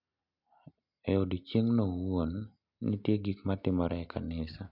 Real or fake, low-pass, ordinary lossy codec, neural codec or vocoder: real; 5.4 kHz; MP3, 48 kbps; none